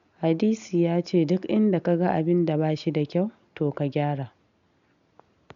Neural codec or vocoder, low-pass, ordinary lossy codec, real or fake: none; 7.2 kHz; none; real